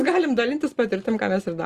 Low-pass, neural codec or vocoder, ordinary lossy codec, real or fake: 14.4 kHz; none; Opus, 32 kbps; real